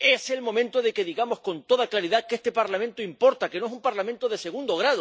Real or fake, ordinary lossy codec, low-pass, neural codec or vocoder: real; none; none; none